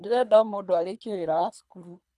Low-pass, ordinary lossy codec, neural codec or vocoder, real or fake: none; none; codec, 24 kHz, 3 kbps, HILCodec; fake